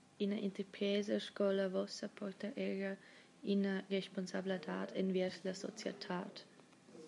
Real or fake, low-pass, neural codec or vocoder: real; 10.8 kHz; none